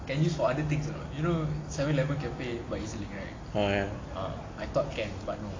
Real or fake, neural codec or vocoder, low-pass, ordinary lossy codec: real; none; 7.2 kHz; AAC, 32 kbps